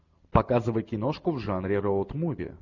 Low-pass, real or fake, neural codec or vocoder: 7.2 kHz; real; none